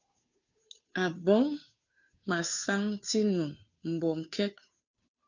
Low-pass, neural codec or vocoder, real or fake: 7.2 kHz; codec, 16 kHz, 2 kbps, FunCodec, trained on Chinese and English, 25 frames a second; fake